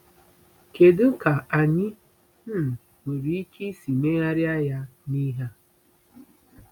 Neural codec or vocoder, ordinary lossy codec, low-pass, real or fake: none; none; 19.8 kHz; real